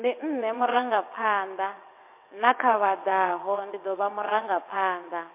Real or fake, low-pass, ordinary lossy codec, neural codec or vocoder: fake; 3.6 kHz; MP3, 24 kbps; vocoder, 22.05 kHz, 80 mel bands, WaveNeXt